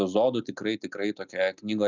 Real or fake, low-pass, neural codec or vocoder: real; 7.2 kHz; none